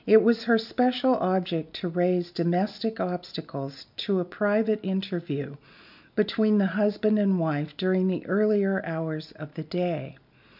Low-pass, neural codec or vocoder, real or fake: 5.4 kHz; none; real